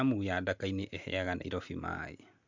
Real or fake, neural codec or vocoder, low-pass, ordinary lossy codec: real; none; 7.2 kHz; MP3, 64 kbps